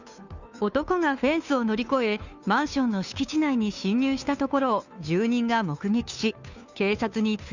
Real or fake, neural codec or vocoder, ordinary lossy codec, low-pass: fake; codec, 16 kHz, 2 kbps, FunCodec, trained on Chinese and English, 25 frames a second; none; 7.2 kHz